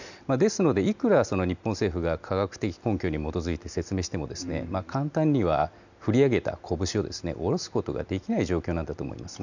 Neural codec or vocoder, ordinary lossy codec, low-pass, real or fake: none; none; 7.2 kHz; real